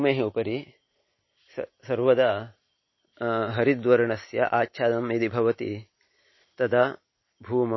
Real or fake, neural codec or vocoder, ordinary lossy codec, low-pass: real; none; MP3, 24 kbps; 7.2 kHz